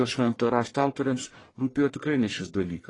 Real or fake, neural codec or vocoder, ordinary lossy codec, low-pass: fake; codec, 44.1 kHz, 1.7 kbps, Pupu-Codec; AAC, 32 kbps; 10.8 kHz